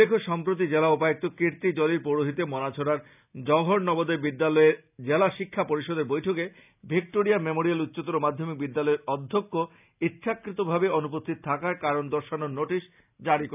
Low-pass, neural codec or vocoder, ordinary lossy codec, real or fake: 3.6 kHz; none; none; real